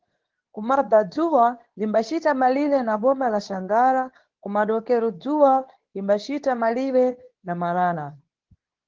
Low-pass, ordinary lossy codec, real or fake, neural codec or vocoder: 7.2 kHz; Opus, 16 kbps; fake; codec, 24 kHz, 0.9 kbps, WavTokenizer, medium speech release version 2